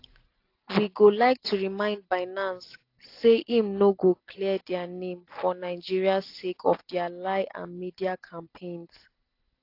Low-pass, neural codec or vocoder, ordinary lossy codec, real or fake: 5.4 kHz; none; AAC, 32 kbps; real